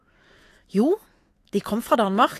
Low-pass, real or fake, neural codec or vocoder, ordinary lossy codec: 14.4 kHz; fake; autoencoder, 48 kHz, 128 numbers a frame, DAC-VAE, trained on Japanese speech; AAC, 48 kbps